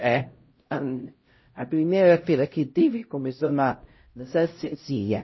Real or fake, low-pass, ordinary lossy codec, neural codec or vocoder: fake; 7.2 kHz; MP3, 24 kbps; codec, 16 kHz, 0.5 kbps, X-Codec, HuBERT features, trained on LibriSpeech